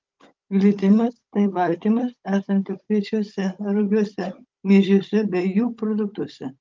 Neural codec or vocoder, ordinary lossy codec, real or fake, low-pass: codec, 16 kHz, 16 kbps, FunCodec, trained on Chinese and English, 50 frames a second; Opus, 24 kbps; fake; 7.2 kHz